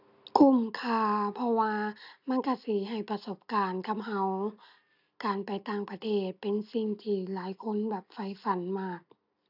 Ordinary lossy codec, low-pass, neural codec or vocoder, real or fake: none; 5.4 kHz; none; real